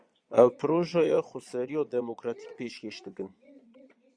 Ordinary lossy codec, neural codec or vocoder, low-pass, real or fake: Opus, 64 kbps; vocoder, 22.05 kHz, 80 mel bands, Vocos; 9.9 kHz; fake